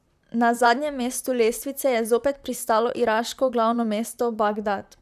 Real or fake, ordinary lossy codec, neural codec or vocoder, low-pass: fake; none; vocoder, 44.1 kHz, 128 mel bands, Pupu-Vocoder; 14.4 kHz